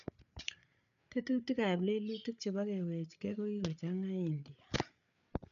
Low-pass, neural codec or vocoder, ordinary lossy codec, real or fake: 7.2 kHz; codec, 16 kHz, 8 kbps, FreqCodec, larger model; none; fake